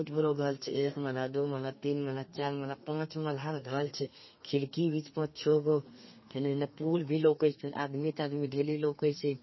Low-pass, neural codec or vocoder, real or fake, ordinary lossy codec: 7.2 kHz; codec, 32 kHz, 1.9 kbps, SNAC; fake; MP3, 24 kbps